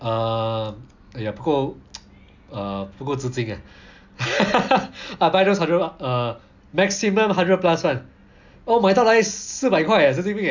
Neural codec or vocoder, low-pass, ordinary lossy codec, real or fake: none; 7.2 kHz; none; real